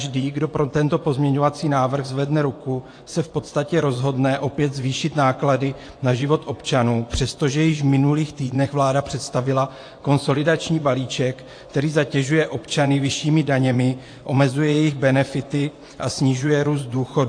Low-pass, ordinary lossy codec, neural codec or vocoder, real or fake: 9.9 kHz; AAC, 48 kbps; vocoder, 24 kHz, 100 mel bands, Vocos; fake